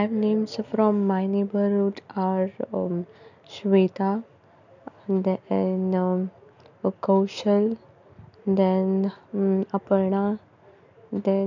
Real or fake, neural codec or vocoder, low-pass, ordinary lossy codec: real; none; 7.2 kHz; none